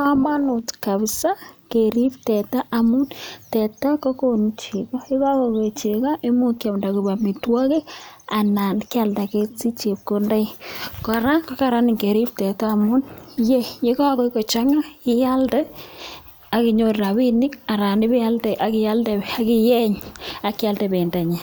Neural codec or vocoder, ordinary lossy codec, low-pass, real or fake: none; none; none; real